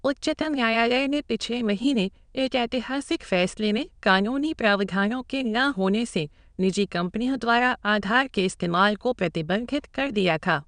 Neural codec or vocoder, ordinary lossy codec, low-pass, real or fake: autoencoder, 22.05 kHz, a latent of 192 numbers a frame, VITS, trained on many speakers; none; 9.9 kHz; fake